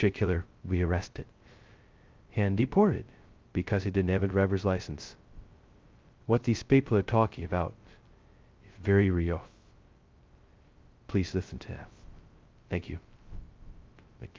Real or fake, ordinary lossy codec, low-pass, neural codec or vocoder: fake; Opus, 24 kbps; 7.2 kHz; codec, 16 kHz, 0.2 kbps, FocalCodec